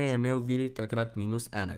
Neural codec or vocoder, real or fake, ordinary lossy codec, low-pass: codec, 32 kHz, 1.9 kbps, SNAC; fake; Opus, 32 kbps; 14.4 kHz